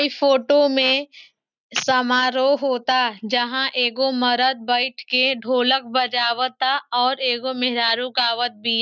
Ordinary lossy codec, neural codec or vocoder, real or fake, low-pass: none; none; real; 7.2 kHz